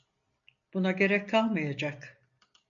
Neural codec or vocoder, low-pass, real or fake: none; 7.2 kHz; real